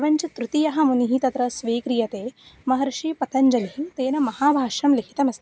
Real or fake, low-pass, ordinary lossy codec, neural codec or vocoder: real; none; none; none